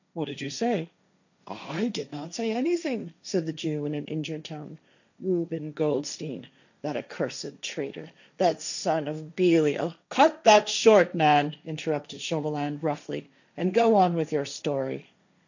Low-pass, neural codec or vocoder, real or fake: 7.2 kHz; codec, 16 kHz, 1.1 kbps, Voila-Tokenizer; fake